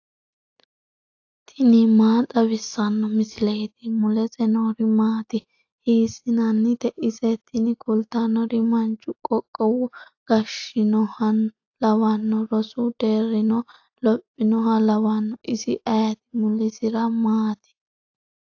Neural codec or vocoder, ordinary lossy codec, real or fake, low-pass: none; AAC, 48 kbps; real; 7.2 kHz